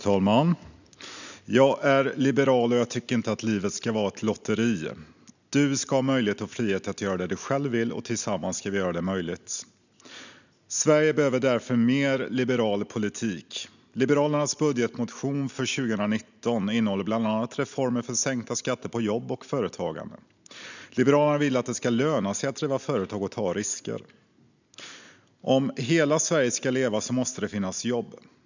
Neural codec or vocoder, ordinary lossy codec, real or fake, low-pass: none; none; real; 7.2 kHz